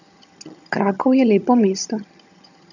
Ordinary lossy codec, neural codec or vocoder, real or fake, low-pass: none; vocoder, 22.05 kHz, 80 mel bands, HiFi-GAN; fake; 7.2 kHz